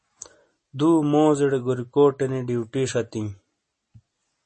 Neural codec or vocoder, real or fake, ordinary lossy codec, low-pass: none; real; MP3, 32 kbps; 10.8 kHz